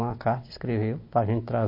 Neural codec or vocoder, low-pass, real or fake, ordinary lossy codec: none; 5.4 kHz; real; MP3, 32 kbps